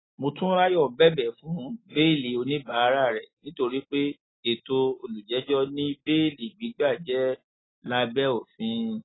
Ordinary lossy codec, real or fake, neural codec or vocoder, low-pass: AAC, 16 kbps; real; none; 7.2 kHz